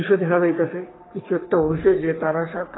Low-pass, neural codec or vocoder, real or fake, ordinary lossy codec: 7.2 kHz; codec, 44.1 kHz, 3.4 kbps, Pupu-Codec; fake; AAC, 16 kbps